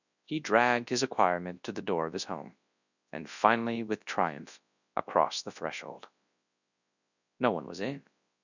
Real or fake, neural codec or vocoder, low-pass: fake; codec, 24 kHz, 0.9 kbps, WavTokenizer, large speech release; 7.2 kHz